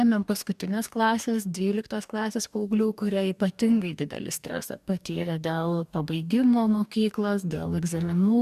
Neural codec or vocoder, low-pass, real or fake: codec, 44.1 kHz, 2.6 kbps, DAC; 14.4 kHz; fake